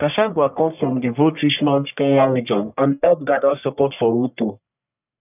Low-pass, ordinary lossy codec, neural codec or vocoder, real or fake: 3.6 kHz; none; codec, 44.1 kHz, 1.7 kbps, Pupu-Codec; fake